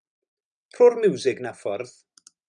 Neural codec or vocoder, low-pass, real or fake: none; 9.9 kHz; real